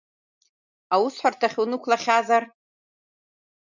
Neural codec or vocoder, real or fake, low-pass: none; real; 7.2 kHz